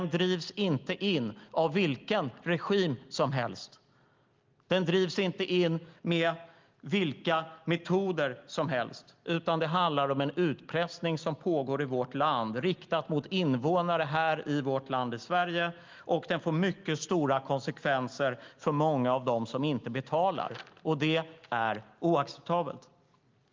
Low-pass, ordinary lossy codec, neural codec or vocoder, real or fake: 7.2 kHz; Opus, 32 kbps; none; real